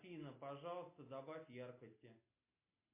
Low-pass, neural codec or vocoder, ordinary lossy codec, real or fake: 3.6 kHz; none; MP3, 24 kbps; real